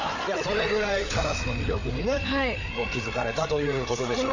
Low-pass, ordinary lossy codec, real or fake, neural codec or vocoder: 7.2 kHz; MP3, 48 kbps; fake; codec, 16 kHz, 8 kbps, FreqCodec, larger model